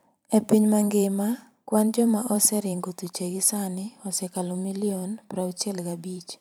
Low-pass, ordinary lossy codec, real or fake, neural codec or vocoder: none; none; fake; vocoder, 44.1 kHz, 128 mel bands every 512 samples, BigVGAN v2